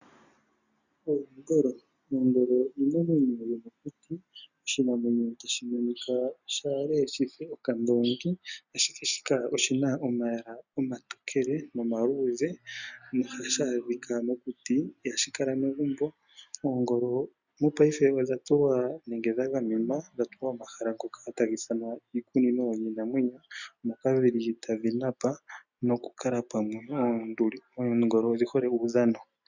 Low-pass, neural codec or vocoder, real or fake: 7.2 kHz; none; real